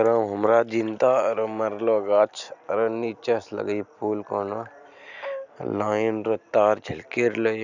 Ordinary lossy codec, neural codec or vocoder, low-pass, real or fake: none; none; 7.2 kHz; real